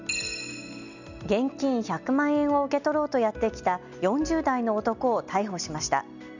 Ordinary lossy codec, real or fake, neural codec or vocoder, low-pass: none; real; none; 7.2 kHz